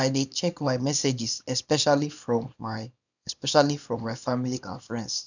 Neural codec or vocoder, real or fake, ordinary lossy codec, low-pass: codec, 24 kHz, 0.9 kbps, WavTokenizer, small release; fake; none; 7.2 kHz